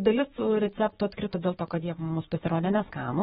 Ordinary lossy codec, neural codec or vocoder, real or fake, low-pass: AAC, 16 kbps; autoencoder, 48 kHz, 128 numbers a frame, DAC-VAE, trained on Japanese speech; fake; 19.8 kHz